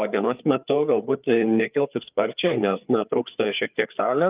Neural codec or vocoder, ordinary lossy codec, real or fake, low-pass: codec, 16 kHz, 4 kbps, FreqCodec, larger model; Opus, 24 kbps; fake; 3.6 kHz